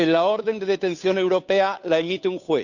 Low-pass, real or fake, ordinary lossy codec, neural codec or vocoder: 7.2 kHz; fake; none; codec, 16 kHz, 2 kbps, FunCodec, trained on Chinese and English, 25 frames a second